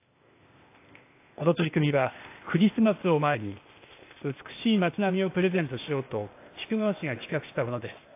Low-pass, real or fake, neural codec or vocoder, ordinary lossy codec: 3.6 kHz; fake; codec, 16 kHz, 0.8 kbps, ZipCodec; AAC, 24 kbps